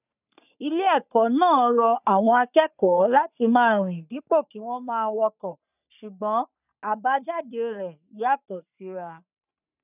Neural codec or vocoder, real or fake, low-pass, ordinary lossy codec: codec, 44.1 kHz, 3.4 kbps, Pupu-Codec; fake; 3.6 kHz; none